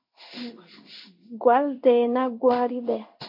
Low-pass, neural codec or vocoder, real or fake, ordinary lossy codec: 5.4 kHz; codec, 16 kHz in and 24 kHz out, 1 kbps, XY-Tokenizer; fake; MP3, 32 kbps